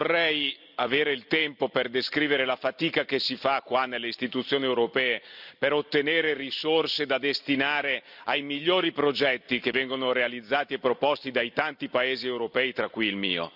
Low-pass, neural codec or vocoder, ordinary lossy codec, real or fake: 5.4 kHz; vocoder, 44.1 kHz, 128 mel bands every 256 samples, BigVGAN v2; none; fake